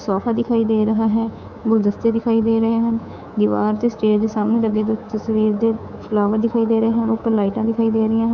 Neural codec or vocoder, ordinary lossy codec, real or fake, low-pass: codec, 24 kHz, 3.1 kbps, DualCodec; none; fake; 7.2 kHz